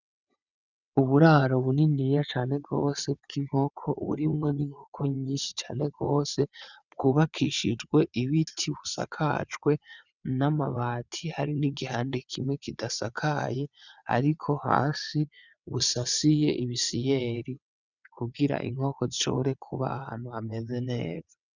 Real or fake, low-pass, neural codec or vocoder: fake; 7.2 kHz; vocoder, 22.05 kHz, 80 mel bands, WaveNeXt